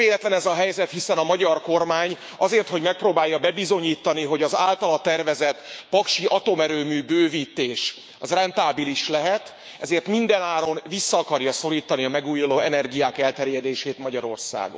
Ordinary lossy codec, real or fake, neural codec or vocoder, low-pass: none; fake; codec, 16 kHz, 6 kbps, DAC; none